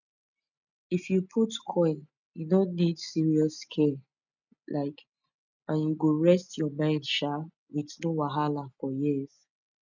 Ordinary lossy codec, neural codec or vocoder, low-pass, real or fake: none; none; 7.2 kHz; real